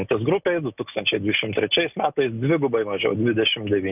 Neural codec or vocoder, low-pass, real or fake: none; 3.6 kHz; real